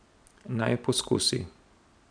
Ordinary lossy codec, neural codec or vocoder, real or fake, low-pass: none; none; real; 9.9 kHz